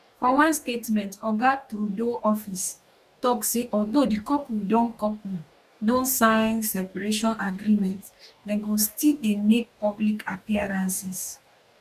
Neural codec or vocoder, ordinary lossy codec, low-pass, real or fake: codec, 44.1 kHz, 2.6 kbps, DAC; none; 14.4 kHz; fake